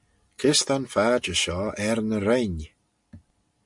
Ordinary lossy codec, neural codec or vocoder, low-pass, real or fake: MP3, 64 kbps; none; 10.8 kHz; real